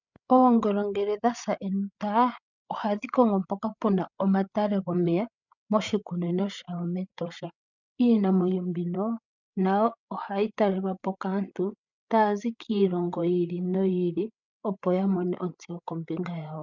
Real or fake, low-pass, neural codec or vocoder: fake; 7.2 kHz; codec, 16 kHz, 8 kbps, FreqCodec, larger model